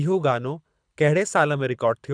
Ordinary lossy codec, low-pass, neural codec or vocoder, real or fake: none; 9.9 kHz; codec, 24 kHz, 6 kbps, HILCodec; fake